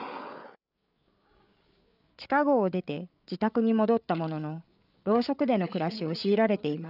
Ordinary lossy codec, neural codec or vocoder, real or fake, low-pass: none; codec, 16 kHz, 8 kbps, FreqCodec, larger model; fake; 5.4 kHz